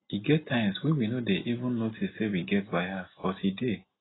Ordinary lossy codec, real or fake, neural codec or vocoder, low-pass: AAC, 16 kbps; real; none; 7.2 kHz